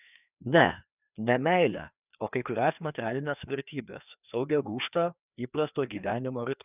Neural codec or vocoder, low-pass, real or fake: codec, 16 kHz, 2 kbps, FreqCodec, larger model; 3.6 kHz; fake